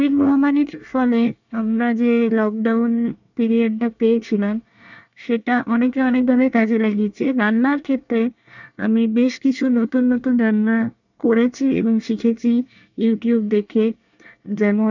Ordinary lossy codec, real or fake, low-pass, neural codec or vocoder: none; fake; 7.2 kHz; codec, 24 kHz, 1 kbps, SNAC